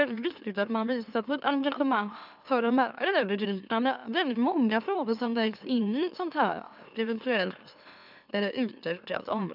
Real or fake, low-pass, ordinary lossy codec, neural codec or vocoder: fake; 5.4 kHz; none; autoencoder, 44.1 kHz, a latent of 192 numbers a frame, MeloTTS